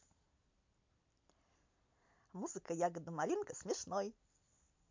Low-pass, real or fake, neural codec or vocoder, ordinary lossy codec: 7.2 kHz; real; none; MP3, 64 kbps